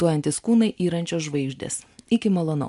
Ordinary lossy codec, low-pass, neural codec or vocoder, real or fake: MP3, 64 kbps; 10.8 kHz; none; real